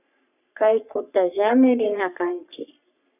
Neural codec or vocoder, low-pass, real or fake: codec, 44.1 kHz, 3.4 kbps, Pupu-Codec; 3.6 kHz; fake